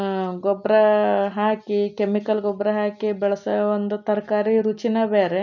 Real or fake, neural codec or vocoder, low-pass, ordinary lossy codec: real; none; 7.2 kHz; none